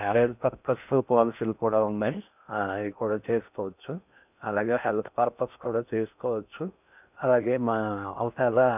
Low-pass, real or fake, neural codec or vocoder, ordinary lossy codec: 3.6 kHz; fake; codec, 16 kHz in and 24 kHz out, 0.6 kbps, FocalCodec, streaming, 2048 codes; none